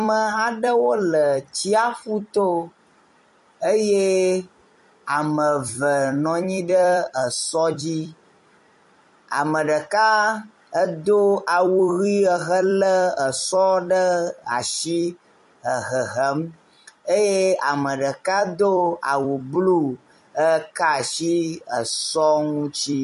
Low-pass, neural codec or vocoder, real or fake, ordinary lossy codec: 14.4 kHz; vocoder, 44.1 kHz, 128 mel bands every 256 samples, BigVGAN v2; fake; MP3, 48 kbps